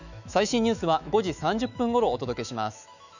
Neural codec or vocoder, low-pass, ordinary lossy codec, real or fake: autoencoder, 48 kHz, 128 numbers a frame, DAC-VAE, trained on Japanese speech; 7.2 kHz; none; fake